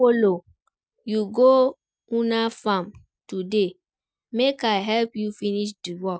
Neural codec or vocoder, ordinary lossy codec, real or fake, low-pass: none; none; real; none